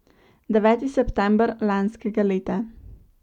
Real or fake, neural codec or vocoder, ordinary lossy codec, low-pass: real; none; none; 19.8 kHz